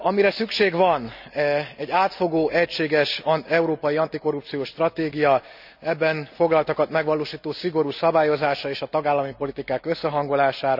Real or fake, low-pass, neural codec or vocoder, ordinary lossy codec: real; 5.4 kHz; none; none